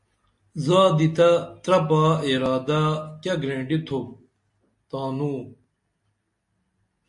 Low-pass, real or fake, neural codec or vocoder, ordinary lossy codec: 10.8 kHz; real; none; MP3, 48 kbps